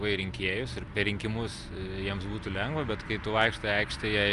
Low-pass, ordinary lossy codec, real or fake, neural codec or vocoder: 10.8 kHz; Opus, 32 kbps; real; none